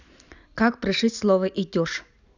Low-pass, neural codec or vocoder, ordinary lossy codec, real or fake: 7.2 kHz; none; none; real